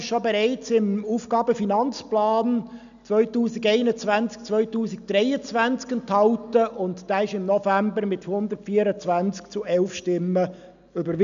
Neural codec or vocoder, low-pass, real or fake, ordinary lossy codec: none; 7.2 kHz; real; none